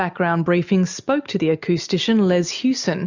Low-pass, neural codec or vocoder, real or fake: 7.2 kHz; none; real